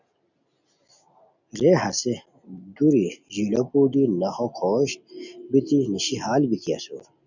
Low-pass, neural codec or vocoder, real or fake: 7.2 kHz; none; real